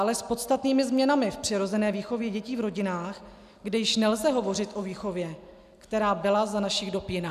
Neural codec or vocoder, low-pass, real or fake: none; 14.4 kHz; real